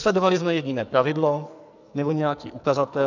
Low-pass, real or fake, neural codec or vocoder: 7.2 kHz; fake; codec, 44.1 kHz, 2.6 kbps, SNAC